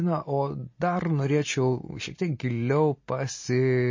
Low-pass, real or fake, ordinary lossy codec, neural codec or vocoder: 7.2 kHz; real; MP3, 32 kbps; none